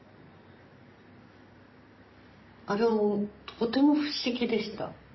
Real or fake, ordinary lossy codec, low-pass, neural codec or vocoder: real; MP3, 24 kbps; 7.2 kHz; none